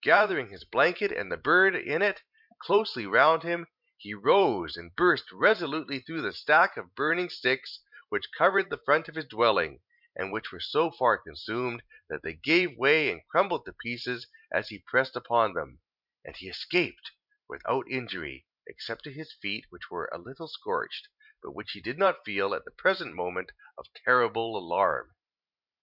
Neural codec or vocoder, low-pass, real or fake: vocoder, 44.1 kHz, 128 mel bands every 512 samples, BigVGAN v2; 5.4 kHz; fake